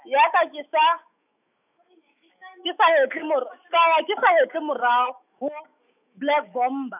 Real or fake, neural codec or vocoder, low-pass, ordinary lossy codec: fake; autoencoder, 48 kHz, 128 numbers a frame, DAC-VAE, trained on Japanese speech; 3.6 kHz; none